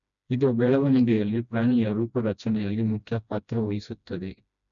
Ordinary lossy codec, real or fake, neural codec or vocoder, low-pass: none; fake; codec, 16 kHz, 1 kbps, FreqCodec, smaller model; 7.2 kHz